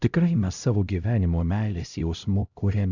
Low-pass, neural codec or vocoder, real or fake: 7.2 kHz; codec, 16 kHz, 0.5 kbps, X-Codec, HuBERT features, trained on LibriSpeech; fake